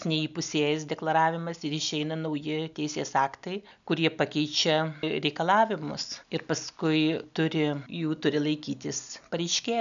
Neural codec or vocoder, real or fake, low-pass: none; real; 7.2 kHz